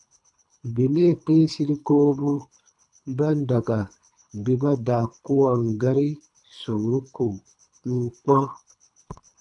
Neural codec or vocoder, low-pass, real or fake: codec, 24 kHz, 3 kbps, HILCodec; 10.8 kHz; fake